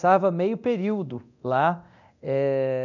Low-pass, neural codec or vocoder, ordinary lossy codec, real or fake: 7.2 kHz; codec, 24 kHz, 0.9 kbps, DualCodec; none; fake